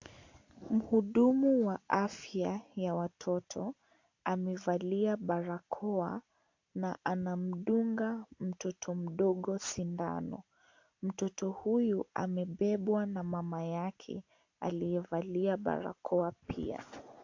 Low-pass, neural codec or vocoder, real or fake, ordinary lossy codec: 7.2 kHz; none; real; AAC, 48 kbps